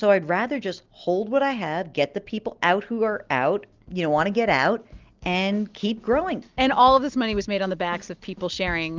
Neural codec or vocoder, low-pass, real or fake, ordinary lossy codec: none; 7.2 kHz; real; Opus, 24 kbps